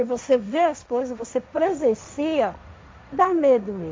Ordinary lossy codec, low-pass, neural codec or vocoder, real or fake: none; none; codec, 16 kHz, 1.1 kbps, Voila-Tokenizer; fake